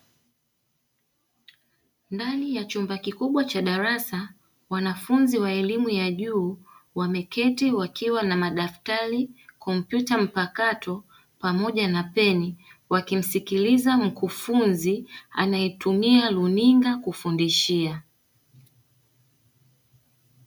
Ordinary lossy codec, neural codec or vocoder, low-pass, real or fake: MP3, 96 kbps; none; 19.8 kHz; real